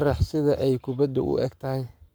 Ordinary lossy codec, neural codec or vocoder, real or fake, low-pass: none; codec, 44.1 kHz, 7.8 kbps, Pupu-Codec; fake; none